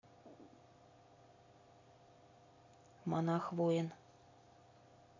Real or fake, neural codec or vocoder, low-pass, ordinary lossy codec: real; none; 7.2 kHz; AAC, 32 kbps